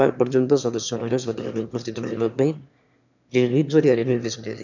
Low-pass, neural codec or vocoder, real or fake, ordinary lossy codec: 7.2 kHz; autoencoder, 22.05 kHz, a latent of 192 numbers a frame, VITS, trained on one speaker; fake; none